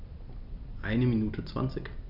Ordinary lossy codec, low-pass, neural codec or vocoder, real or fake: none; 5.4 kHz; none; real